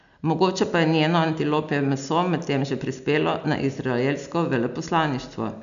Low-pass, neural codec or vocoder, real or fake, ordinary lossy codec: 7.2 kHz; none; real; none